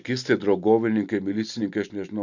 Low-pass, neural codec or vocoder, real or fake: 7.2 kHz; none; real